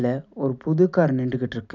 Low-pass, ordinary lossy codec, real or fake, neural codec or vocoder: 7.2 kHz; none; real; none